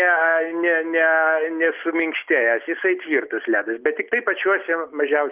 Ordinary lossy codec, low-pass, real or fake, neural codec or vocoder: Opus, 32 kbps; 3.6 kHz; real; none